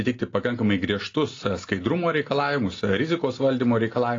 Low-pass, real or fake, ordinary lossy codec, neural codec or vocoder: 7.2 kHz; real; AAC, 32 kbps; none